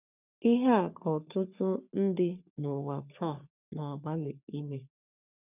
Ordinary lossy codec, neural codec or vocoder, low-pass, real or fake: none; codec, 44.1 kHz, 3.4 kbps, Pupu-Codec; 3.6 kHz; fake